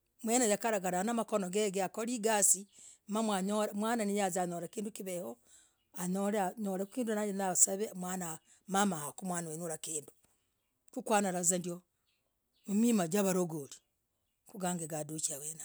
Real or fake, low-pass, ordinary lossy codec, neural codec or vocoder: real; none; none; none